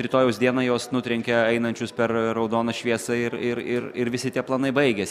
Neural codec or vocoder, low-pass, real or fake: none; 14.4 kHz; real